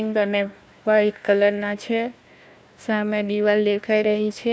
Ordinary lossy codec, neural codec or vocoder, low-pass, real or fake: none; codec, 16 kHz, 1 kbps, FunCodec, trained on Chinese and English, 50 frames a second; none; fake